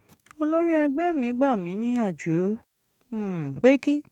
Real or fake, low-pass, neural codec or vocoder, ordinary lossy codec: fake; 19.8 kHz; codec, 44.1 kHz, 2.6 kbps, DAC; none